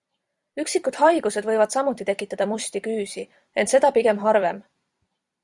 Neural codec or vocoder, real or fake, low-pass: vocoder, 44.1 kHz, 128 mel bands every 256 samples, BigVGAN v2; fake; 10.8 kHz